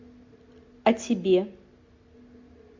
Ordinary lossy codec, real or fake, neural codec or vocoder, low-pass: MP3, 48 kbps; real; none; 7.2 kHz